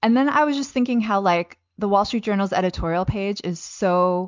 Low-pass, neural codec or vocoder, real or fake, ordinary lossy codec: 7.2 kHz; none; real; MP3, 64 kbps